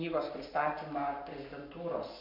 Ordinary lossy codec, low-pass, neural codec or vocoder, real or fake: MP3, 48 kbps; 5.4 kHz; codec, 44.1 kHz, 7.8 kbps, Pupu-Codec; fake